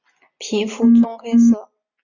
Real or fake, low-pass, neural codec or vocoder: real; 7.2 kHz; none